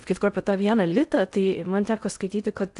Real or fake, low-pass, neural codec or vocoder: fake; 10.8 kHz; codec, 16 kHz in and 24 kHz out, 0.6 kbps, FocalCodec, streaming, 4096 codes